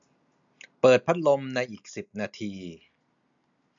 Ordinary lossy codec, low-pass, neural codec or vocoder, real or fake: none; 7.2 kHz; none; real